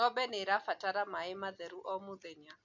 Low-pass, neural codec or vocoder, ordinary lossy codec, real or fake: 7.2 kHz; none; none; real